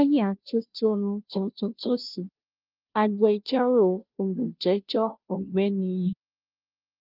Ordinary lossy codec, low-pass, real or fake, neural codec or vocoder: Opus, 24 kbps; 5.4 kHz; fake; codec, 16 kHz, 0.5 kbps, FunCodec, trained on Chinese and English, 25 frames a second